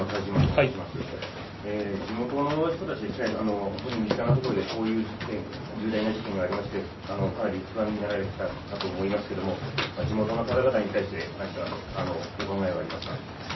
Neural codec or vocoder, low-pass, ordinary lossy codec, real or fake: none; 7.2 kHz; MP3, 24 kbps; real